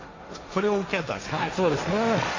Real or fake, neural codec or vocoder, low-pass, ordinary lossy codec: fake; codec, 16 kHz, 1.1 kbps, Voila-Tokenizer; 7.2 kHz; AAC, 32 kbps